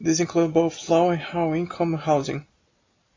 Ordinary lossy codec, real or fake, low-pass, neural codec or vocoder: AAC, 32 kbps; real; 7.2 kHz; none